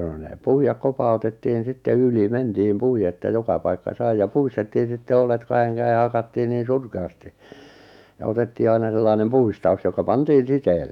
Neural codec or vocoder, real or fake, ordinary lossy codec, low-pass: autoencoder, 48 kHz, 128 numbers a frame, DAC-VAE, trained on Japanese speech; fake; none; 19.8 kHz